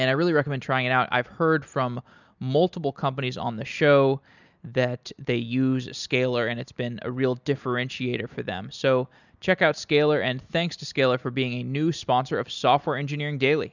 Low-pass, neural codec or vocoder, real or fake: 7.2 kHz; none; real